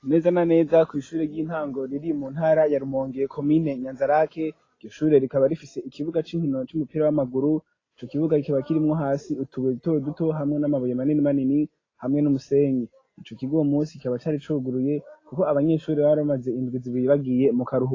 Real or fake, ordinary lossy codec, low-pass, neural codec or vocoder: real; AAC, 32 kbps; 7.2 kHz; none